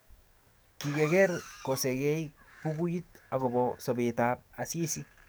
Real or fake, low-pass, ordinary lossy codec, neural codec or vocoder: fake; none; none; codec, 44.1 kHz, 7.8 kbps, DAC